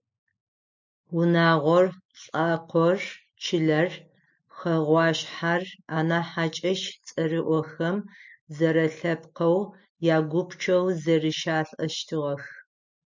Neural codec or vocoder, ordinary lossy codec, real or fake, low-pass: none; MP3, 64 kbps; real; 7.2 kHz